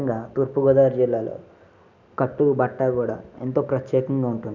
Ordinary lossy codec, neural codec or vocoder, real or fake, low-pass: none; none; real; 7.2 kHz